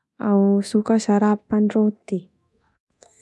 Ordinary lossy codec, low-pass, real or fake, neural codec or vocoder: none; none; fake; codec, 24 kHz, 0.9 kbps, DualCodec